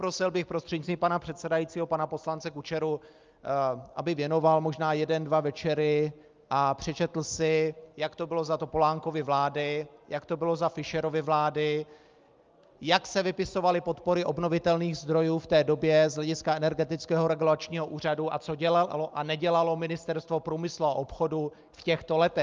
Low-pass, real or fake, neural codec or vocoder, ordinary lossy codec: 7.2 kHz; real; none; Opus, 24 kbps